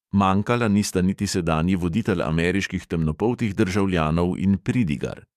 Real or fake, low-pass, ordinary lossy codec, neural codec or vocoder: fake; 14.4 kHz; MP3, 96 kbps; codec, 44.1 kHz, 7.8 kbps, DAC